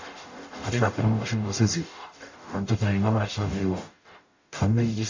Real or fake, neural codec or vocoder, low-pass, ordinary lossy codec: fake; codec, 44.1 kHz, 0.9 kbps, DAC; 7.2 kHz; AAC, 48 kbps